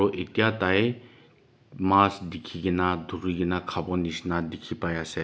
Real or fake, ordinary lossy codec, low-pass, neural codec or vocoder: real; none; none; none